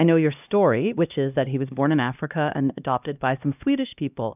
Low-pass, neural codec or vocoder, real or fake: 3.6 kHz; codec, 16 kHz, 1 kbps, X-Codec, HuBERT features, trained on LibriSpeech; fake